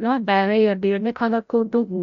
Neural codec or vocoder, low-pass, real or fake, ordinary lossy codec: codec, 16 kHz, 0.5 kbps, FreqCodec, larger model; 7.2 kHz; fake; none